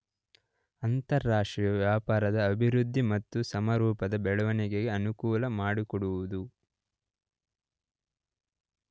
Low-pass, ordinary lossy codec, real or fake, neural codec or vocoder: none; none; real; none